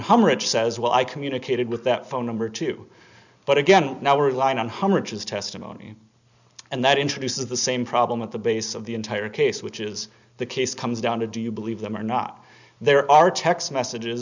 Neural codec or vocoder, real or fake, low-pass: none; real; 7.2 kHz